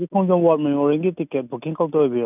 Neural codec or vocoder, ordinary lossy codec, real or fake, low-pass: none; none; real; 3.6 kHz